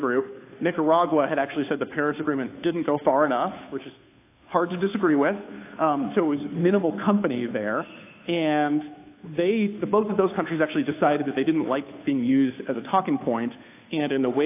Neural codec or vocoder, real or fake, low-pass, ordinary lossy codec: codec, 16 kHz, 2 kbps, FunCodec, trained on Chinese and English, 25 frames a second; fake; 3.6 kHz; AAC, 24 kbps